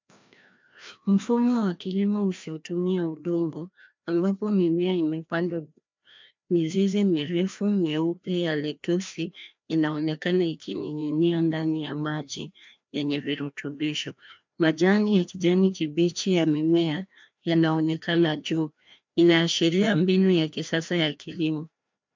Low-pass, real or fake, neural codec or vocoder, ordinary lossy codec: 7.2 kHz; fake; codec, 16 kHz, 1 kbps, FreqCodec, larger model; MP3, 64 kbps